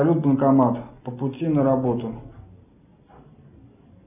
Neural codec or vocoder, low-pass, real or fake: none; 3.6 kHz; real